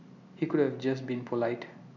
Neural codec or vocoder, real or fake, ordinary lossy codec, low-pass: none; real; none; 7.2 kHz